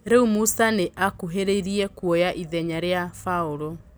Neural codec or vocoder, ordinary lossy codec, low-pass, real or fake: none; none; none; real